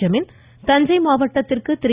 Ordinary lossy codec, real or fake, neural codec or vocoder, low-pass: Opus, 64 kbps; real; none; 3.6 kHz